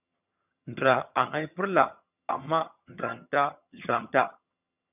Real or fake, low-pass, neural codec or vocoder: fake; 3.6 kHz; vocoder, 22.05 kHz, 80 mel bands, HiFi-GAN